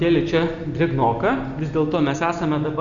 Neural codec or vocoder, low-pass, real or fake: none; 7.2 kHz; real